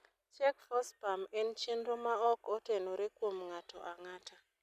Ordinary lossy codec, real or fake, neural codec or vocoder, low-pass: none; real; none; 14.4 kHz